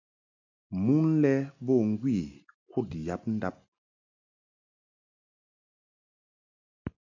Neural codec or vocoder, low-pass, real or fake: none; 7.2 kHz; real